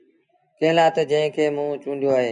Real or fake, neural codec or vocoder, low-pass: real; none; 9.9 kHz